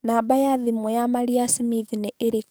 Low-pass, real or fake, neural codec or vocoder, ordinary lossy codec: none; fake; codec, 44.1 kHz, 7.8 kbps, DAC; none